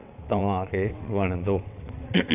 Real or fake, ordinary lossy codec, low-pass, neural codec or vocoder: fake; none; 3.6 kHz; vocoder, 22.05 kHz, 80 mel bands, WaveNeXt